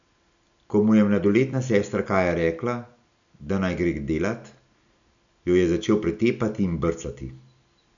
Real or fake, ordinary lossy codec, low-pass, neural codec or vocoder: real; none; 7.2 kHz; none